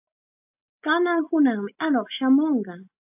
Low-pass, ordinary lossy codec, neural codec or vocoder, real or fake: 3.6 kHz; AAC, 32 kbps; none; real